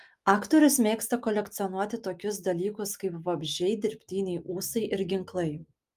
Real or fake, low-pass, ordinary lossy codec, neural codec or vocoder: real; 14.4 kHz; Opus, 32 kbps; none